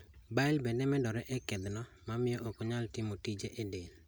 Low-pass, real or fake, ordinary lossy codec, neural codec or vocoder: none; real; none; none